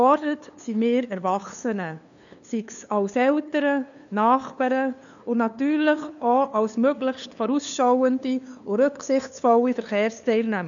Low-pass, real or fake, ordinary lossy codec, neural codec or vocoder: 7.2 kHz; fake; none; codec, 16 kHz, 2 kbps, FunCodec, trained on LibriTTS, 25 frames a second